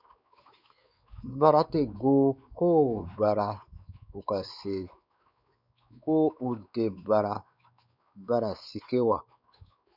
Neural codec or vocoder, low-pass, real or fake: codec, 16 kHz, 4 kbps, X-Codec, WavLM features, trained on Multilingual LibriSpeech; 5.4 kHz; fake